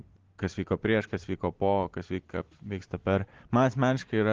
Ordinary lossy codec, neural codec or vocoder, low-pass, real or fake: Opus, 16 kbps; none; 7.2 kHz; real